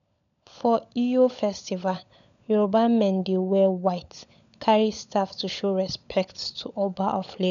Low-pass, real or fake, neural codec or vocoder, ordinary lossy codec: 7.2 kHz; fake; codec, 16 kHz, 16 kbps, FunCodec, trained on LibriTTS, 50 frames a second; none